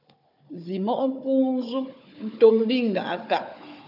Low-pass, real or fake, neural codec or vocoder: 5.4 kHz; fake; codec, 16 kHz, 4 kbps, FunCodec, trained on Chinese and English, 50 frames a second